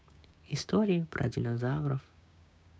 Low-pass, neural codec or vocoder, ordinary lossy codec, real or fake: none; codec, 16 kHz, 6 kbps, DAC; none; fake